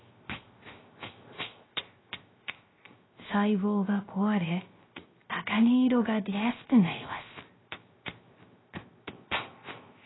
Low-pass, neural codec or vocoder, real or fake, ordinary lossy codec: 7.2 kHz; codec, 16 kHz, 0.3 kbps, FocalCodec; fake; AAC, 16 kbps